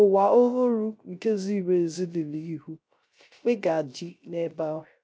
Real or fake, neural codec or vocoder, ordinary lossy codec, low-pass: fake; codec, 16 kHz, 0.3 kbps, FocalCodec; none; none